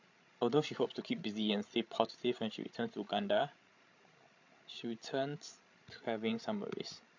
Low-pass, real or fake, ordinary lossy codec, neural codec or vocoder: 7.2 kHz; fake; MP3, 48 kbps; codec, 16 kHz, 16 kbps, FreqCodec, larger model